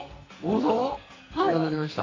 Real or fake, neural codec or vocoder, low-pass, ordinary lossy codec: fake; codec, 44.1 kHz, 2.6 kbps, SNAC; 7.2 kHz; AAC, 32 kbps